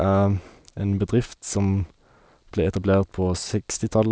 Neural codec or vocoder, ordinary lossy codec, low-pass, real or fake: none; none; none; real